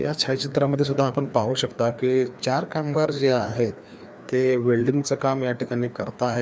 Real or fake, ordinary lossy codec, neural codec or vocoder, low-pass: fake; none; codec, 16 kHz, 2 kbps, FreqCodec, larger model; none